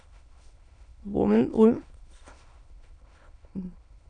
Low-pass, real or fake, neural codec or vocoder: 9.9 kHz; fake; autoencoder, 22.05 kHz, a latent of 192 numbers a frame, VITS, trained on many speakers